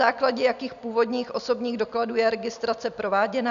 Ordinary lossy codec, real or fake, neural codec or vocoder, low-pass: AAC, 96 kbps; real; none; 7.2 kHz